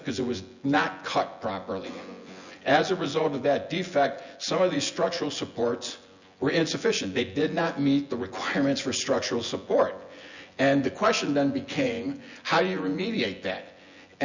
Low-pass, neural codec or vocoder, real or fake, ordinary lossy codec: 7.2 kHz; vocoder, 24 kHz, 100 mel bands, Vocos; fake; Opus, 64 kbps